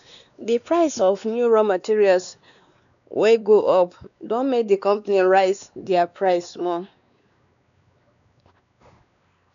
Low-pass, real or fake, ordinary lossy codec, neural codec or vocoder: 7.2 kHz; fake; none; codec, 16 kHz, 2 kbps, X-Codec, WavLM features, trained on Multilingual LibriSpeech